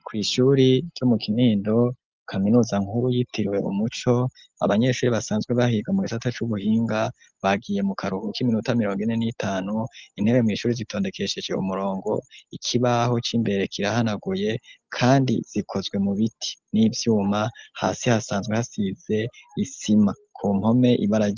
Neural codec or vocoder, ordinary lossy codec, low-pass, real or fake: none; Opus, 32 kbps; 7.2 kHz; real